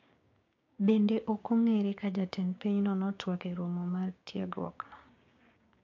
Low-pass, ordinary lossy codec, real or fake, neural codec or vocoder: 7.2 kHz; MP3, 48 kbps; fake; codec, 16 kHz, 6 kbps, DAC